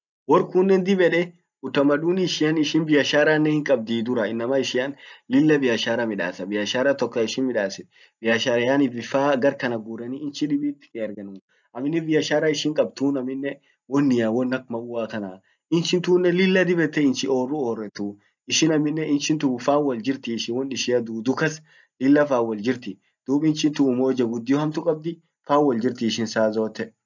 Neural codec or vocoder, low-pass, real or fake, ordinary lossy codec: none; 7.2 kHz; real; none